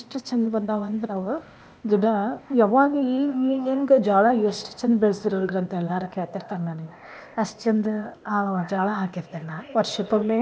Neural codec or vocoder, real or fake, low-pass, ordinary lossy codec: codec, 16 kHz, 0.8 kbps, ZipCodec; fake; none; none